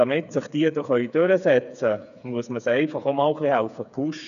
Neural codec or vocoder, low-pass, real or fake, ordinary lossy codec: codec, 16 kHz, 4 kbps, FreqCodec, smaller model; 7.2 kHz; fake; none